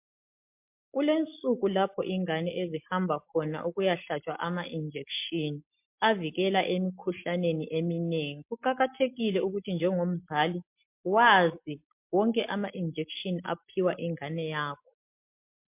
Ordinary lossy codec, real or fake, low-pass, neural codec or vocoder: MP3, 32 kbps; real; 3.6 kHz; none